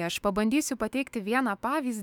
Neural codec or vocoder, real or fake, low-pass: autoencoder, 48 kHz, 128 numbers a frame, DAC-VAE, trained on Japanese speech; fake; 19.8 kHz